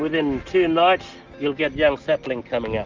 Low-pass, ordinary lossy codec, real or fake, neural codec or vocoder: 7.2 kHz; Opus, 32 kbps; real; none